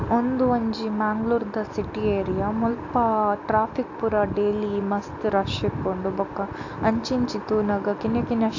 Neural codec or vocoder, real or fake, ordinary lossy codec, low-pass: none; real; AAC, 48 kbps; 7.2 kHz